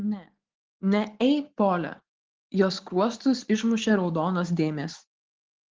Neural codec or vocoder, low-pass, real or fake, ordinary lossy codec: none; 7.2 kHz; real; Opus, 16 kbps